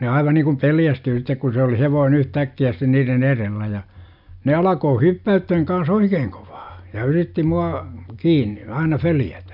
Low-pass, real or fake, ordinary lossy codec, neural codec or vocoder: 5.4 kHz; real; none; none